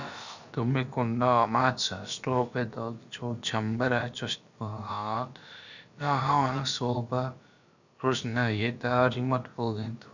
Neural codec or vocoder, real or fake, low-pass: codec, 16 kHz, about 1 kbps, DyCAST, with the encoder's durations; fake; 7.2 kHz